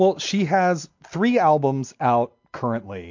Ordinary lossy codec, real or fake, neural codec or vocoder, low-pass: MP3, 48 kbps; real; none; 7.2 kHz